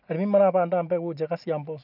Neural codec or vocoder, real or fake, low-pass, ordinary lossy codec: none; real; 5.4 kHz; none